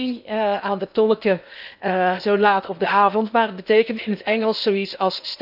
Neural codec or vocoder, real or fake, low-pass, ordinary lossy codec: codec, 16 kHz in and 24 kHz out, 0.8 kbps, FocalCodec, streaming, 65536 codes; fake; 5.4 kHz; none